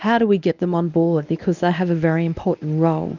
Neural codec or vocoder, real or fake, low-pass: codec, 24 kHz, 0.9 kbps, WavTokenizer, medium speech release version 1; fake; 7.2 kHz